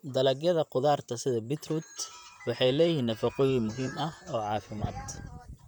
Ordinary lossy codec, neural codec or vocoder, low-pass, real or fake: none; vocoder, 44.1 kHz, 128 mel bands, Pupu-Vocoder; 19.8 kHz; fake